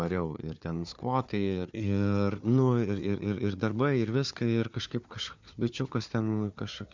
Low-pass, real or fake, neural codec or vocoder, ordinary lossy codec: 7.2 kHz; fake; codec, 16 kHz, 4 kbps, FunCodec, trained on Chinese and English, 50 frames a second; MP3, 64 kbps